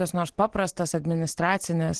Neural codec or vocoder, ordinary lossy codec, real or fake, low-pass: none; Opus, 16 kbps; real; 10.8 kHz